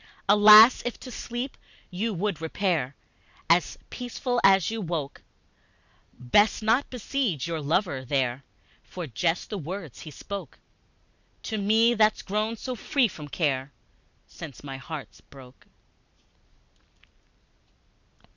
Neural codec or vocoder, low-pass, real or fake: none; 7.2 kHz; real